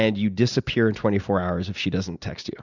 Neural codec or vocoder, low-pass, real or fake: none; 7.2 kHz; real